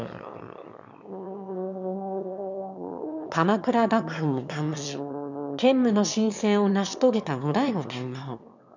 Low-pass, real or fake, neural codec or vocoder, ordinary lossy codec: 7.2 kHz; fake; autoencoder, 22.05 kHz, a latent of 192 numbers a frame, VITS, trained on one speaker; none